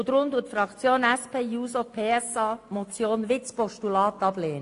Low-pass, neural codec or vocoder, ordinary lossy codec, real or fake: 10.8 kHz; none; AAC, 48 kbps; real